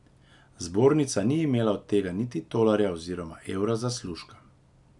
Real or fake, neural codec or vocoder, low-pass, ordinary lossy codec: real; none; 10.8 kHz; none